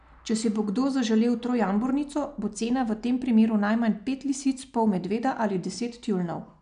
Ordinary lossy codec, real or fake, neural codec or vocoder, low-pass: none; real; none; 9.9 kHz